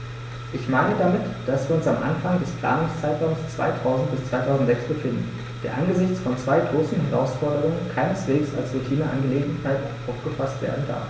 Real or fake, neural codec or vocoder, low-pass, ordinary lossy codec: real; none; none; none